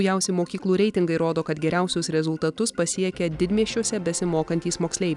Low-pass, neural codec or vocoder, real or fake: 10.8 kHz; none; real